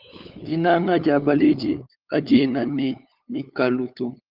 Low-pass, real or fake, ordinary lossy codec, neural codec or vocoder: 5.4 kHz; fake; Opus, 32 kbps; codec, 16 kHz, 8 kbps, FunCodec, trained on LibriTTS, 25 frames a second